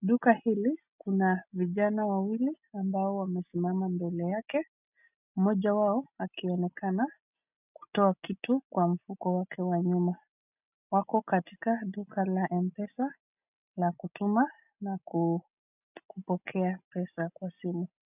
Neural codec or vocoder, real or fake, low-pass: none; real; 3.6 kHz